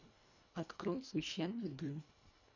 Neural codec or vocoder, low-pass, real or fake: codec, 24 kHz, 1.5 kbps, HILCodec; 7.2 kHz; fake